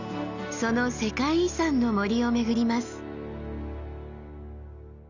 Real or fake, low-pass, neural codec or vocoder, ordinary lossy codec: real; 7.2 kHz; none; none